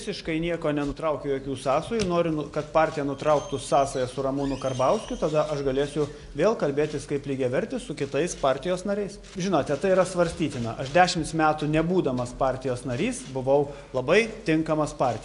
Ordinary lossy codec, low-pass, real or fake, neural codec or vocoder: AAC, 64 kbps; 10.8 kHz; real; none